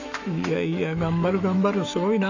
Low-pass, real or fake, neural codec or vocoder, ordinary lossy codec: 7.2 kHz; fake; autoencoder, 48 kHz, 128 numbers a frame, DAC-VAE, trained on Japanese speech; none